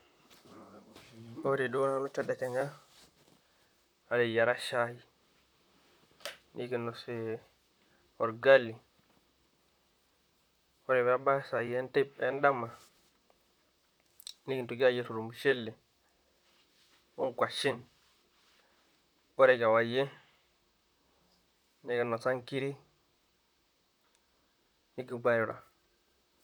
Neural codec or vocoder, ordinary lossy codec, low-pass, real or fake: vocoder, 44.1 kHz, 128 mel bands, Pupu-Vocoder; none; none; fake